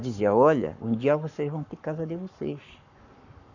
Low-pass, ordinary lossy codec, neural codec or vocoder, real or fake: 7.2 kHz; none; codec, 44.1 kHz, 7.8 kbps, Pupu-Codec; fake